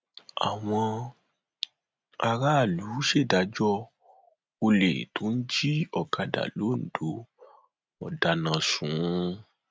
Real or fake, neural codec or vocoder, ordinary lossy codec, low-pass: real; none; none; none